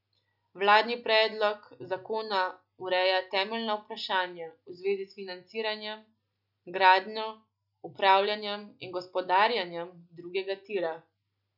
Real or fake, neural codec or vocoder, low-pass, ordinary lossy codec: real; none; 5.4 kHz; none